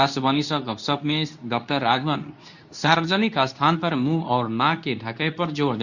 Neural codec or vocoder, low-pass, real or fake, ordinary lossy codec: codec, 24 kHz, 0.9 kbps, WavTokenizer, medium speech release version 2; 7.2 kHz; fake; none